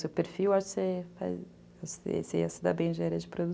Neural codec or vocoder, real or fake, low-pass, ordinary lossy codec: none; real; none; none